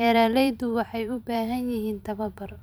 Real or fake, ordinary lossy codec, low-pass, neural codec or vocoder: fake; none; none; vocoder, 44.1 kHz, 128 mel bands every 512 samples, BigVGAN v2